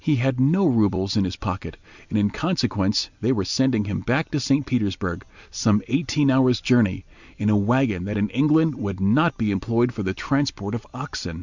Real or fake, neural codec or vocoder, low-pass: real; none; 7.2 kHz